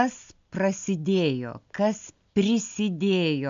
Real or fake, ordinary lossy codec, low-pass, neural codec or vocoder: real; MP3, 64 kbps; 7.2 kHz; none